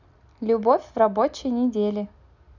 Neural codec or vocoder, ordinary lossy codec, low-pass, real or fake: none; none; 7.2 kHz; real